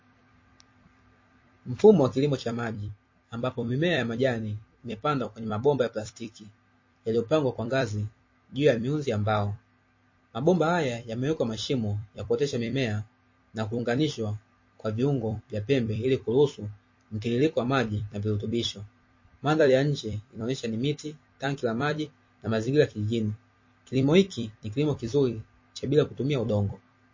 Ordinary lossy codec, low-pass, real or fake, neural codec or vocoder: MP3, 32 kbps; 7.2 kHz; fake; vocoder, 44.1 kHz, 128 mel bands every 256 samples, BigVGAN v2